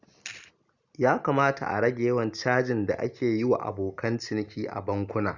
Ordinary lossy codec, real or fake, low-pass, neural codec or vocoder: Opus, 64 kbps; real; 7.2 kHz; none